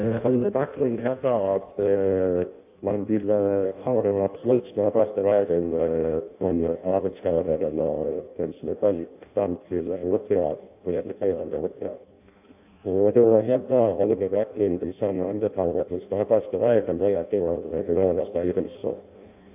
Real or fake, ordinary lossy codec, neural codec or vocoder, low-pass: fake; AAC, 32 kbps; codec, 16 kHz in and 24 kHz out, 0.6 kbps, FireRedTTS-2 codec; 3.6 kHz